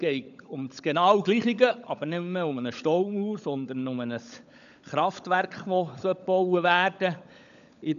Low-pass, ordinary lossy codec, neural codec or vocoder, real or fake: 7.2 kHz; none; codec, 16 kHz, 16 kbps, FunCodec, trained on LibriTTS, 50 frames a second; fake